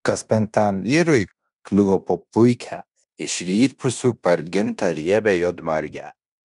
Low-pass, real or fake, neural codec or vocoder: 10.8 kHz; fake; codec, 16 kHz in and 24 kHz out, 0.9 kbps, LongCat-Audio-Codec, fine tuned four codebook decoder